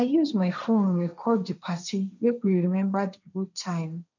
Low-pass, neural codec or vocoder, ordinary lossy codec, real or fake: 7.2 kHz; codec, 16 kHz, 1.1 kbps, Voila-Tokenizer; none; fake